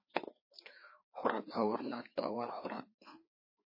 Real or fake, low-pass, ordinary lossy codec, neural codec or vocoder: fake; 5.4 kHz; MP3, 24 kbps; codec, 16 kHz, 2 kbps, FreqCodec, larger model